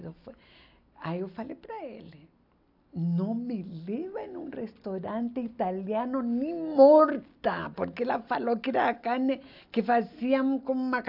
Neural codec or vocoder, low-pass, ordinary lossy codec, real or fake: none; 5.4 kHz; AAC, 48 kbps; real